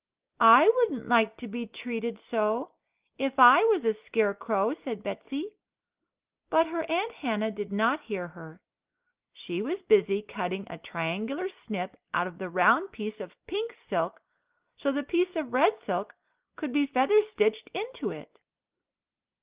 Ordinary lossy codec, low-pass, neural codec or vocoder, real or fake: Opus, 32 kbps; 3.6 kHz; none; real